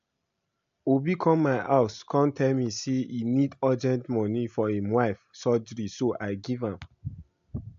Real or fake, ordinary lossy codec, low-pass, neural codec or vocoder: real; AAC, 64 kbps; 7.2 kHz; none